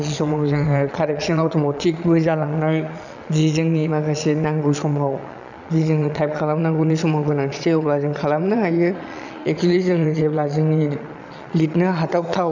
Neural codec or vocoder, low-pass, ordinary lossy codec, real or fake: vocoder, 22.05 kHz, 80 mel bands, Vocos; 7.2 kHz; none; fake